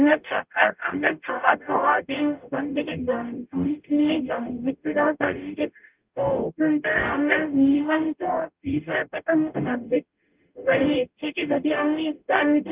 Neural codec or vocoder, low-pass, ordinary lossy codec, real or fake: codec, 44.1 kHz, 0.9 kbps, DAC; 3.6 kHz; Opus, 24 kbps; fake